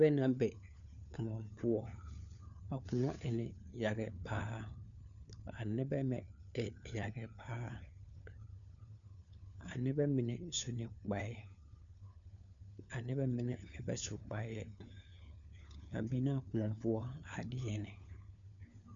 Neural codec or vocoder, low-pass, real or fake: codec, 16 kHz, 4 kbps, FunCodec, trained on LibriTTS, 50 frames a second; 7.2 kHz; fake